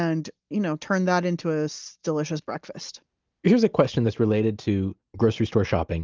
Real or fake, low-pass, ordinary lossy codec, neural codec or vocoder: real; 7.2 kHz; Opus, 24 kbps; none